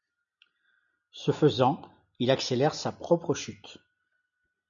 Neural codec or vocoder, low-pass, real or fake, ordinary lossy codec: none; 7.2 kHz; real; MP3, 96 kbps